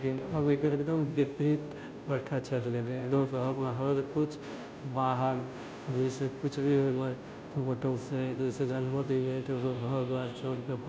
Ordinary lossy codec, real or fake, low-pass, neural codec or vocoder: none; fake; none; codec, 16 kHz, 0.5 kbps, FunCodec, trained on Chinese and English, 25 frames a second